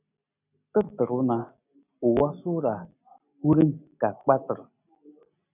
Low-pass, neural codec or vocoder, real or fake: 3.6 kHz; none; real